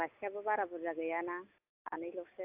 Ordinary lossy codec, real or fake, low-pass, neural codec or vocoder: AAC, 32 kbps; real; 3.6 kHz; none